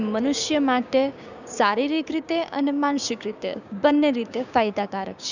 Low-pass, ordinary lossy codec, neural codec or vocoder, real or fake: 7.2 kHz; none; codec, 16 kHz, 6 kbps, DAC; fake